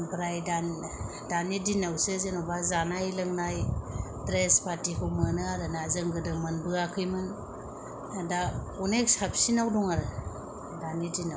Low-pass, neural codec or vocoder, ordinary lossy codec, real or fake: none; none; none; real